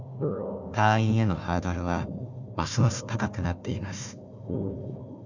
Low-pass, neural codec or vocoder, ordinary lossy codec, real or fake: 7.2 kHz; codec, 16 kHz, 1 kbps, FunCodec, trained on Chinese and English, 50 frames a second; none; fake